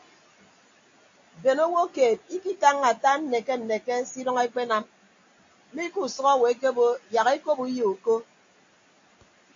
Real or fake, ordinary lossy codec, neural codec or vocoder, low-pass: real; AAC, 48 kbps; none; 7.2 kHz